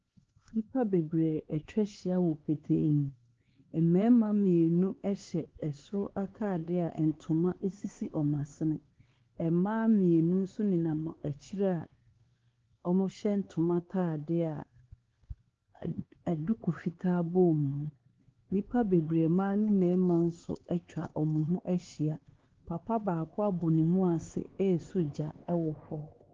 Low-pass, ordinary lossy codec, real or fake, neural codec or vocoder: 7.2 kHz; Opus, 16 kbps; fake; codec, 16 kHz, 4 kbps, X-Codec, HuBERT features, trained on LibriSpeech